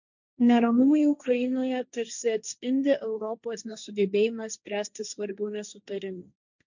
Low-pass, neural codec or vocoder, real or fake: 7.2 kHz; codec, 16 kHz, 1.1 kbps, Voila-Tokenizer; fake